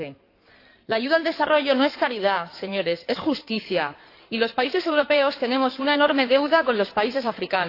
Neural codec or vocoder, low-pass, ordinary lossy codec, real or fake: codec, 16 kHz in and 24 kHz out, 2.2 kbps, FireRedTTS-2 codec; 5.4 kHz; AAC, 32 kbps; fake